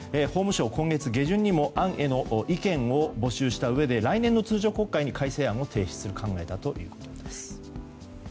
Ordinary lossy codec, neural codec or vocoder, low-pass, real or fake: none; none; none; real